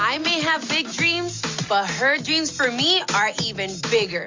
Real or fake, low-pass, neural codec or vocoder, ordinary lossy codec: real; 7.2 kHz; none; MP3, 48 kbps